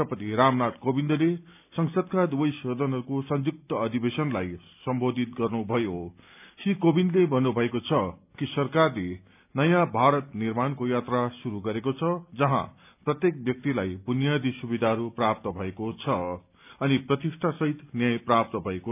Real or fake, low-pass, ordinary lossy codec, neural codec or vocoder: real; 3.6 kHz; none; none